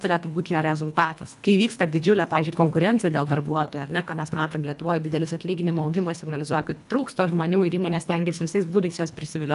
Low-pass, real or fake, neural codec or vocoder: 10.8 kHz; fake; codec, 24 kHz, 1.5 kbps, HILCodec